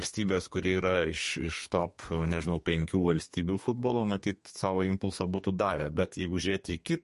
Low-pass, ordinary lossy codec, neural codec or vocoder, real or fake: 14.4 kHz; MP3, 48 kbps; codec, 32 kHz, 1.9 kbps, SNAC; fake